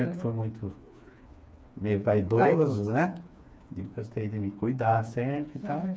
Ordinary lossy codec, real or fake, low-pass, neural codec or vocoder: none; fake; none; codec, 16 kHz, 4 kbps, FreqCodec, smaller model